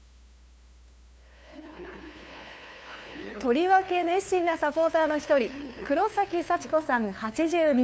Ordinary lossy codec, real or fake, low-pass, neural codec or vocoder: none; fake; none; codec, 16 kHz, 2 kbps, FunCodec, trained on LibriTTS, 25 frames a second